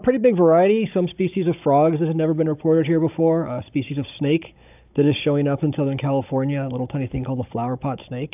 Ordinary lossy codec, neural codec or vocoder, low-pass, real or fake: AAC, 32 kbps; codec, 16 kHz, 16 kbps, FunCodec, trained on Chinese and English, 50 frames a second; 3.6 kHz; fake